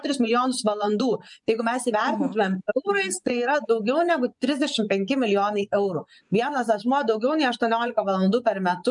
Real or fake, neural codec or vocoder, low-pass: fake; vocoder, 24 kHz, 100 mel bands, Vocos; 10.8 kHz